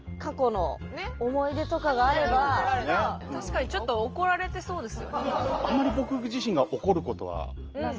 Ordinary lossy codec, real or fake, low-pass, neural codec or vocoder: Opus, 24 kbps; real; 7.2 kHz; none